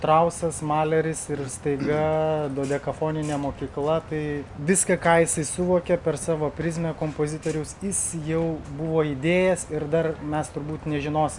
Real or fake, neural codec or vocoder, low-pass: real; none; 10.8 kHz